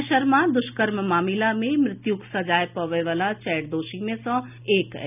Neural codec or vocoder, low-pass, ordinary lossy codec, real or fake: none; 3.6 kHz; none; real